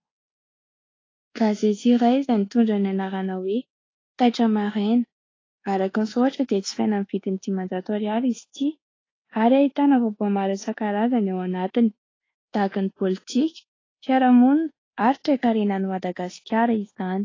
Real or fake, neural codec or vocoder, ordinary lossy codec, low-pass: fake; codec, 24 kHz, 1.2 kbps, DualCodec; AAC, 32 kbps; 7.2 kHz